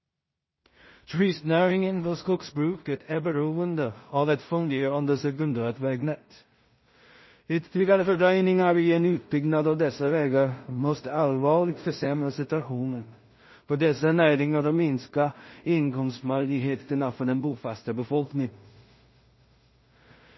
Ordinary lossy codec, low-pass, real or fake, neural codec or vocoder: MP3, 24 kbps; 7.2 kHz; fake; codec, 16 kHz in and 24 kHz out, 0.4 kbps, LongCat-Audio-Codec, two codebook decoder